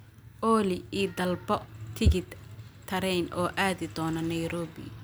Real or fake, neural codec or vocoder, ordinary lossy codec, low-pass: real; none; none; none